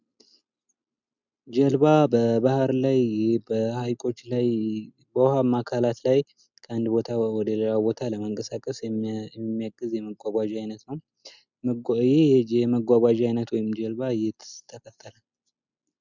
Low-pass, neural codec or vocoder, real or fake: 7.2 kHz; none; real